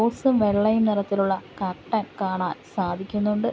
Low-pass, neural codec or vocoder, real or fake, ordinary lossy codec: none; none; real; none